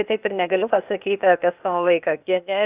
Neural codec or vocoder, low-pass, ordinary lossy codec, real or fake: codec, 16 kHz, 0.8 kbps, ZipCodec; 3.6 kHz; Opus, 64 kbps; fake